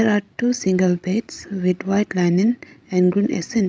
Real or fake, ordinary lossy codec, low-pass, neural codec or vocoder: fake; none; none; codec, 16 kHz, 16 kbps, FreqCodec, larger model